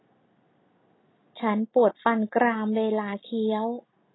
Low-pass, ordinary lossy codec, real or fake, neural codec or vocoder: 7.2 kHz; AAC, 16 kbps; real; none